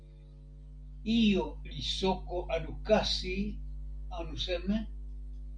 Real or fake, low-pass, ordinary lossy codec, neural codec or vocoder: real; 9.9 kHz; MP3, 64 kbps; none